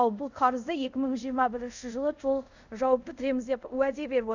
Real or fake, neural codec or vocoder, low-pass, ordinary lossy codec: fake; codec, 24 kHz, 0.5 kbps, DualCodec; 7.2 kHz; none